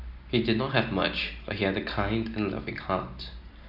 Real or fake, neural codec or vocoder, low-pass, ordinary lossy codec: real; none; 5.4 kHz; none